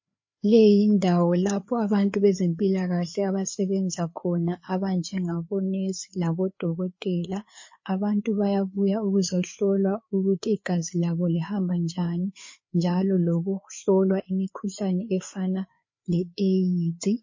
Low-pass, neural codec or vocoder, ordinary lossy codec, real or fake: 7.2 kHz; codec, 16 kHz, 4 kbps, FreqCodec, larger model; MP3, 32 kbps; fake